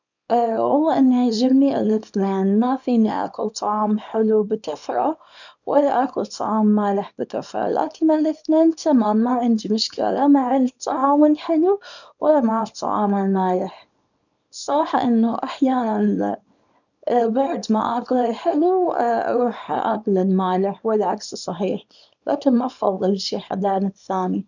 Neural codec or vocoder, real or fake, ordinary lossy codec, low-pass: codec, 24 kHz, 0.9 kbps, WavTokenizer, small release; fake; none; 7.2 kHz